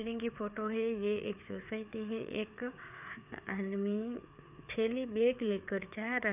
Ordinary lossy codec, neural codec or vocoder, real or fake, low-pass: none; codec, 16 kHz, 4 kbps, FreqCodec, larger model; fake; 3.6 kHz